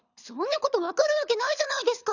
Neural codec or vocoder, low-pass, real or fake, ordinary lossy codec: codec, 24 kHz, 6 kbps, HILCodec; 7.2 kHz; fake; none